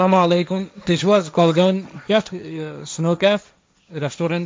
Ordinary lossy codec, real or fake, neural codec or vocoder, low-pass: none; fake; codec, 16 kHz, 1.1 kbps, Voila-Tokenizer; none